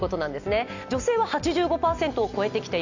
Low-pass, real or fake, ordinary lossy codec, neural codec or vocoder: 7.2 kHz; real; none; none